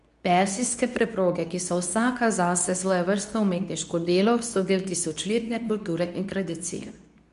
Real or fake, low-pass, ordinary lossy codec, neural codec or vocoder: fake; 10.8 kHz; none; codec, 24 kHz, 0.9 kbps, WavTokenizer, medium speech release version 2